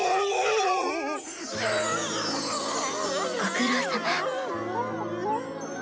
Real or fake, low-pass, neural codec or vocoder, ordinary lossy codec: real; none; none; none